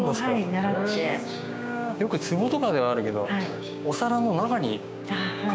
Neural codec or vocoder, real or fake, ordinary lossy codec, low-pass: codec, 16 kHz, 6 kbps, DAC; fake; none; none